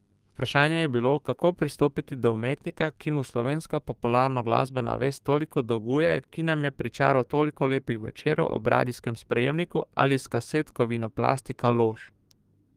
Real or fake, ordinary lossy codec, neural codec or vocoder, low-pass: fake; Opus, 32 kbps; codec, 32 kHz, 1.9 kbps, SNAC; 14.4 kHz